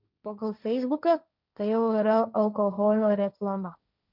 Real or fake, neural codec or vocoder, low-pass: fake; codec, 16 kHz, 1.1 kbps, Voila-Tokenizer; 5.4 kHz